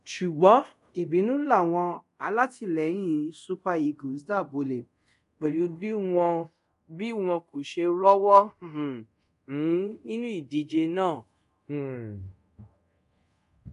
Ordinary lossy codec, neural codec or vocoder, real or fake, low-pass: none; codec, 24 kHz, 0.5 kbps, DualCodec; fake; 10.8 kHz